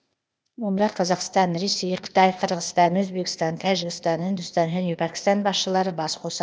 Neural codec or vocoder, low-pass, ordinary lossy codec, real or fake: codec, 16 kHz, 0.8 kbps, ZipCodec; none; none; fake